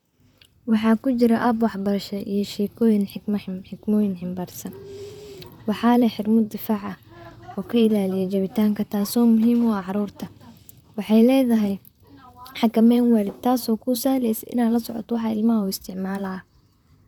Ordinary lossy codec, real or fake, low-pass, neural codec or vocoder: none; fake; 19.8 kHz; vocoder, 44.1 kHz, 128 mel bands, Pupu-Vocoder